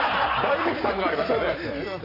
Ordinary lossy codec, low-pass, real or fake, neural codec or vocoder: none; 5.4 kHz; real; none